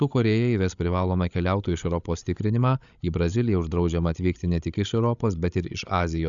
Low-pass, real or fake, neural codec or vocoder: 7.2 kHz; fake; codec, 16 kHz, 16 kbps, FreqCodec, larger model